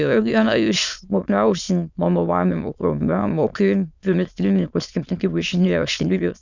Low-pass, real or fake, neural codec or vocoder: 7.2 kHz; fake; autoencoder, 22.05 kHz, a latent of 192 numbers a frame, VITS, trained on many speakers